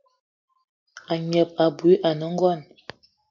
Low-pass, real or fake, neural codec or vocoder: 7.2 kHz; real; none